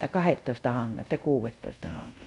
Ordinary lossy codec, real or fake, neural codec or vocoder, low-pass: none; fake; codec, 24 kHz, 0.5 kbps, DualCodec; 10.8 kHz